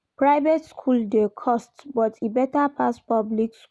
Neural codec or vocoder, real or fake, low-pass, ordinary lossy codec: none; real; none; none